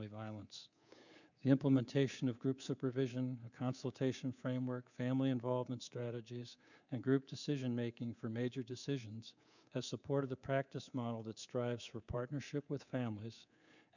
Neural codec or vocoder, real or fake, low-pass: codec, 24 kHz, 3.1 kbps, DualCodec; fake; 7.2 kHz